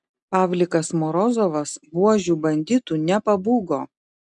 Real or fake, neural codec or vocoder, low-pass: real; none; 10.8 kHz